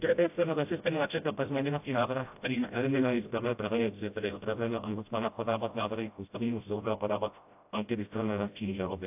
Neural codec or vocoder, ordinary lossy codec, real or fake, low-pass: codec, 16 kHz, 0.5 kbps, FreqCodec, smaller model; AAC, 32 kbps; fake; 3.6 kHz